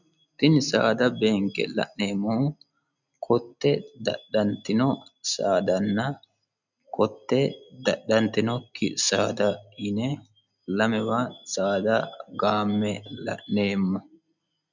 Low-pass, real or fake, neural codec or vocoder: 7.2 kHz; real; none